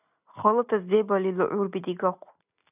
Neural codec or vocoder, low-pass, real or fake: none; 3.6 kHz; real